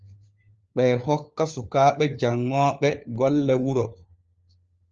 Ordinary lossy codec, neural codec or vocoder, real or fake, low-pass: Opus, 24 kbps; codec, 16 kHz, 4 kbps, FunCodec, trained on LibriTTS, 50 frames a second; fake; 7.2 kHz